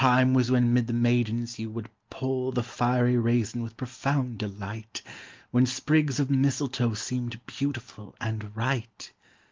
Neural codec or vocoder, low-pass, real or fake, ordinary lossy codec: none; 7.2 kHz; real; Opus, 32 kbps